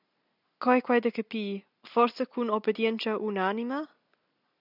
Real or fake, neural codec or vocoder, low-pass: real; none; 5.4 kHz